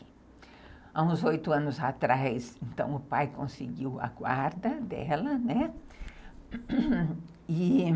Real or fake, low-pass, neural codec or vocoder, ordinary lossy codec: real; none; none; none